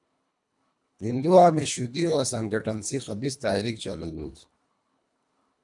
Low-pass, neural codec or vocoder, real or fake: 10.8 kHz; codec, 24 kHz, 1.5 kbps, HILCodec; fake